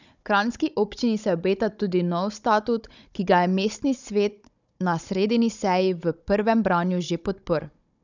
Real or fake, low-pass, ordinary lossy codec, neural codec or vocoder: fake; 7.2 kHz; none; codec, 16 kHz, 16 kbps, FunCodec, trained on Chinese and English, 50 frames a second